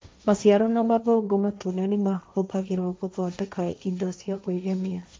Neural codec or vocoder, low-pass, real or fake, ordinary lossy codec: codec, 16 kHz, 1.1 kbps, Voila-Tokenizer; none; fake; none